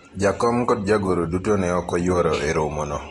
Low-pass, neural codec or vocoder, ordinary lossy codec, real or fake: 19.8 kHz; none; AAC, 32 kbps; real